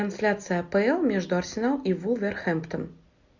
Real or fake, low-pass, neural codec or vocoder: real; 7.2 kHz; none